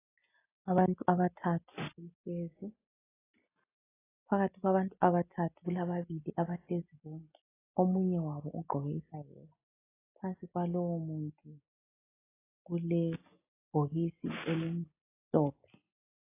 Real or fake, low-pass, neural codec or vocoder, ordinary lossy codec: real; 3.6 kHz; none; AAC, 16 kbps